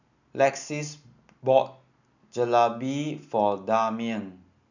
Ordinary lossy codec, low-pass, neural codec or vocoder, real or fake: none; 7.2 kHz; none; real